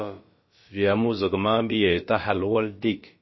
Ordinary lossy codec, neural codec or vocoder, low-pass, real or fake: MP3, 24 kbps; codec, 16 kHz, about 1 kbps, DyCAST, with the encoder's durations; 7.2 kHz; fake